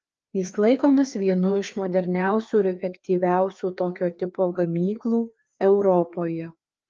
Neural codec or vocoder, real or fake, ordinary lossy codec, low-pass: codec, 16 kHz, 2 kbps, FreqCodec, larger model; fake; Opus, 24 kbps; 7.2 kHz